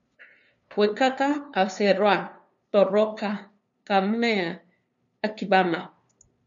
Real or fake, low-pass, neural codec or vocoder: fake; 7.2 kHz; codec, 16 kHz, 2 kbps, FunCodec, trained on LibriTTS, 25 frames a second